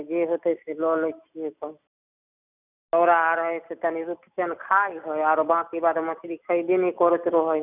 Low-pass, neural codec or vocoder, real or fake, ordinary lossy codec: 3.6 kHz; none; real; none